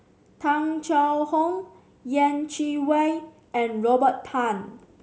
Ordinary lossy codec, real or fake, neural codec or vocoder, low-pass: none; real; none; none